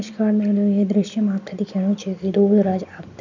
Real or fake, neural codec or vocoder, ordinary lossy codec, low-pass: real; none; none; 7.2 kHz